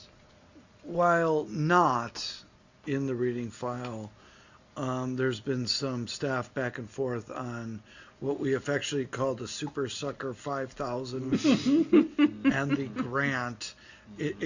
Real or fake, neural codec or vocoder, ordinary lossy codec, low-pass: real; none; Opus, 64 kbps; 7.2 kHz